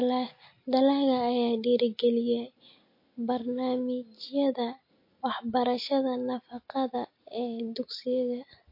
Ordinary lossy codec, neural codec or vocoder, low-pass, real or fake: MP3, 32 kbps; none; 5.4 kHz; real